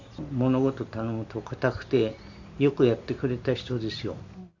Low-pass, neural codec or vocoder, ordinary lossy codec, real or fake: 7.2 kHz; none; none; real